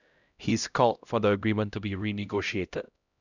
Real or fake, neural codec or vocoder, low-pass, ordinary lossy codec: fake; codec, 16 kHz, 0.5 kbps, X-Codec, HuBERT features, trained on LibriSpeech; 7.2 kHz; none